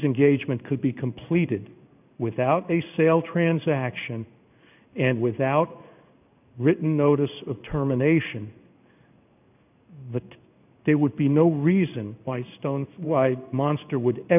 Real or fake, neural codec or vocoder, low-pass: fake; codec, 16 kHz in and 24 kHz out, 1 kbps, XY-Tokenizer; 3.6 kHz